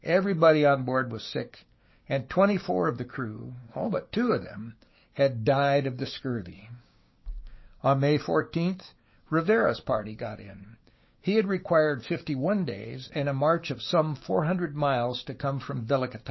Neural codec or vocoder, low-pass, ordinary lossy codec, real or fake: codec, 16 kHz, 6 kbps, DAC; 7.2 kHz; MP3, 24 kbps; fake